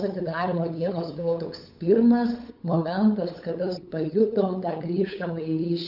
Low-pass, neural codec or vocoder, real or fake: 5.4 kHz; codec, 16 kHz, 8 kbps, FunCodec, trained on LibriTTS, 25 frames a second; fake